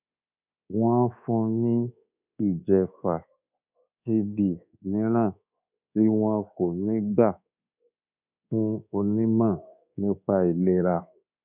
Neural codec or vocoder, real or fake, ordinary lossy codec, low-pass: codec, 24 kHz, 1.2 kbps, DualCodec; fake; none; 3.6 kHz